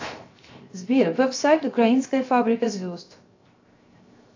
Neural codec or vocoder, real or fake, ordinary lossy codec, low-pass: codec, 16 kHz, 0.7 kbps, FocalCodec; fake; AAC, 48 kbps; 7.2 kHz